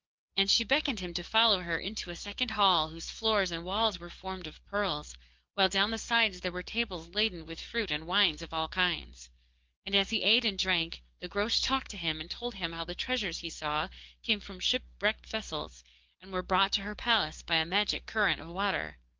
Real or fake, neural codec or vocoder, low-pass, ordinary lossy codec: fake; codec, 44.1 kHz, 7.8 kbps, DAC; 7.2 kHz; Opus, 32 kbps